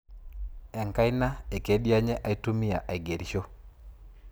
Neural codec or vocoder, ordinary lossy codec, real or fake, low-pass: vocoder, 44.1 kHz, 128 mel bands every 256 samples, BigVGAN v2; none; fake; none